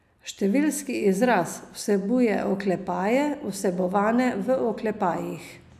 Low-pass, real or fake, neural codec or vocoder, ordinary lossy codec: 14.4 kHz; real; none; none